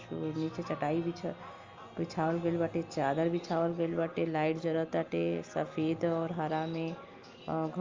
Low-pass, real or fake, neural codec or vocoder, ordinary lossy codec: 7.2 kHz; real; none; Opus, 32 kbps